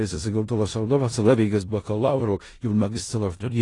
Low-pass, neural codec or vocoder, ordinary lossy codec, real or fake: 10.8 kHz; codec, 16 kHz in and 24 kHz out, 0.4 kbps, LongCat-Audio-Codec, four codebook decoder; AAC, 32 kbps; fake